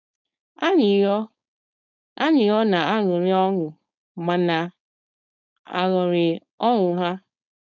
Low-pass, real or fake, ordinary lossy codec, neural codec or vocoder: 7.2 kHz; fake; none; codec, 16 kHz, 4.8 kbps, FACodec